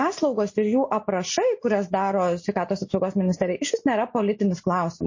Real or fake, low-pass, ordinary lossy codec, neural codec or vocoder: real; 7.2 kHz; MP3, 32 kbps; none